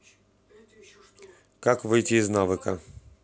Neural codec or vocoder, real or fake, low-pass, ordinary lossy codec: none; real; none; none